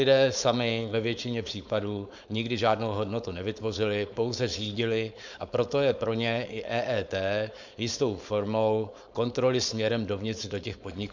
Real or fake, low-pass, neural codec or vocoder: fake; 7.2 kHz; codec, 16 kHz, 4.8 kbps, FACodec